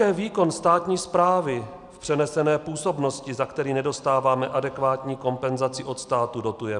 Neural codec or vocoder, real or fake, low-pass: none; real; 10.8 kHz